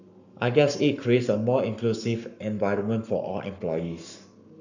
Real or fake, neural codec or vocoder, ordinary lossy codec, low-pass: fake; codec, 44.1 kHz, 7.8 kbps, Pupu-Codec; none; 7.2 kHz